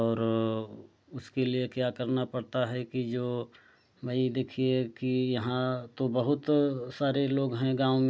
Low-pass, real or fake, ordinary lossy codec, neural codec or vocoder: none; real; none; none